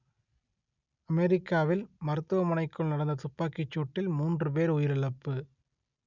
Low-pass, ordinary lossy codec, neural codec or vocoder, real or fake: 7.2 kHz; none; none; real